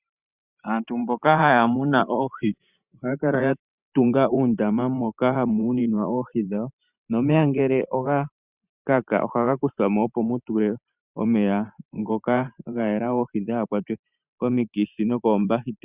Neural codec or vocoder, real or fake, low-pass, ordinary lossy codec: vocoder, 44.1 kHz, 128 mel bands every 512 samples, BigVGAN v2; fake; 3.6 kHz; Opus, 64 kbps